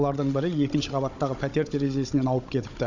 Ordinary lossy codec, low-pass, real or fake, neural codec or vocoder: none; 7.2 kHz; fake; codec, 16 kHz, 16 kbps, FunCodec, trained on Chinese and English, 50 frames a second